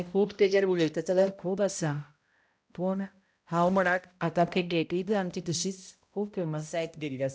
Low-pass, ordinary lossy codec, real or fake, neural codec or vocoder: none; none; fake; codec, 16 kHz, 0.5 kbps, X-Codec, HuBERT features, trained on balanced general audio